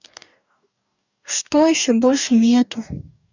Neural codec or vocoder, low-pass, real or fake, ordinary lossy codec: codec, 44.1 kHz, 2.6 kbps, DAC; 7.2 kHz; fake; none